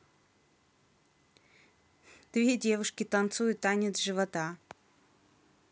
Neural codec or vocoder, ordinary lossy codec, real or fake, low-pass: none; none; real; none